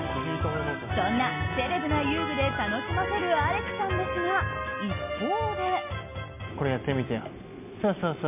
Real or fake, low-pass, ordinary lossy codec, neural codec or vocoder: real; 3.6 kHz; MP3, 32 kbps; none